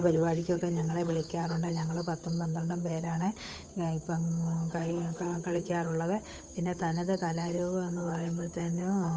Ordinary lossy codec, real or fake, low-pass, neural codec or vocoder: none; fake; none; codec, 16 kHz, 8 kbps, FunCodec, trained on Chinese and English, 25 frames a second